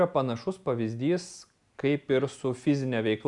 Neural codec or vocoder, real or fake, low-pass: none; real; 10.8 kHz